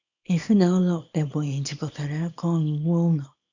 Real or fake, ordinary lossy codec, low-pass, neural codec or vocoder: fake; none; 7.2 kHz; codec, 24 kHz, 0.9 kbps, WavTokenizer, small release